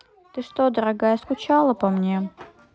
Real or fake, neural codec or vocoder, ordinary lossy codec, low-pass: real; none; none; none